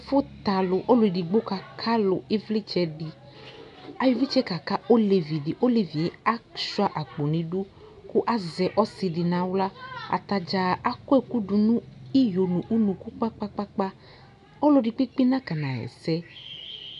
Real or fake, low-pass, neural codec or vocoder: real; 10.8 kHz; none